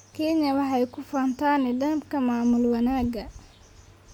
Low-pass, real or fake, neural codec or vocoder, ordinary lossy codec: 19.8 kHz; real; none; none